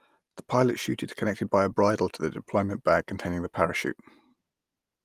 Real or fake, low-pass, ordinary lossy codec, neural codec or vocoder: real; 14.4 kHz; Opus, 32 kbps; none